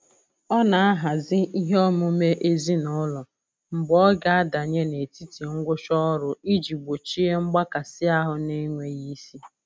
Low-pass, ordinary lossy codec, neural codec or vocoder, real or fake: none; none; none; real